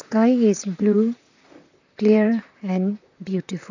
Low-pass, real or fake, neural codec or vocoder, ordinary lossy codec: 7.2 kHz; fake; vocoder, 44.1 kHz, 128 mel bands, Pupu-Vocoder; none